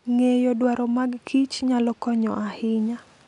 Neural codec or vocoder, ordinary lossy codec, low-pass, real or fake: none; none; 10.8 kHz; real